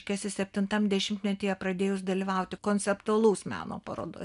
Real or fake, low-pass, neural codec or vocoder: real; 10.8 kHz; none